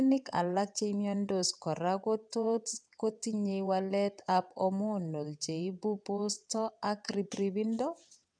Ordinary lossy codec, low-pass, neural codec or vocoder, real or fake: none; 9.9 kHz; vocoder, 22.05 kHz, 80 mel bands, WaveNeXt; fake